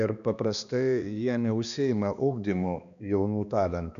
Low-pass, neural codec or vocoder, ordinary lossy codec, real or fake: 7.2 kHz; codec, 16 kHz, 2 kbps, X-Codec, HuBERT features, trained on balanced general audio; AAC, 96 kbps; fake